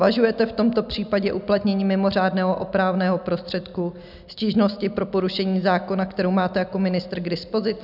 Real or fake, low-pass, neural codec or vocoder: real; 5.4 kHz; none